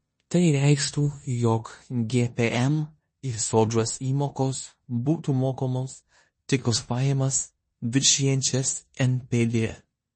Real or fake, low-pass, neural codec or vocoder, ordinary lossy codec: fake; 10.8 kHz; codec, 16 kHz in and 24 kHz out, 0.9 kbps, LongCat-Audio-Codec, four codebook decoder; MP3, 32 kbps